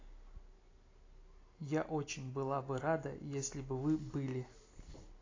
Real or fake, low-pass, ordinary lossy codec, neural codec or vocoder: real; 7.2 kHz; AAC, 32 kbps; none